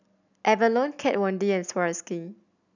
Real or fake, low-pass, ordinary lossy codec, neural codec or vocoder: real; 7.2 kHz; none; none